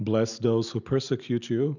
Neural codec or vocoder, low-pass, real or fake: none; 7.2 kHz; real